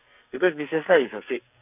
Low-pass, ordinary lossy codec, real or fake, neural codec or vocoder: 3.6 kHz; none; fake; codec, 44.1 kHz, 2.6 kbps, SNAC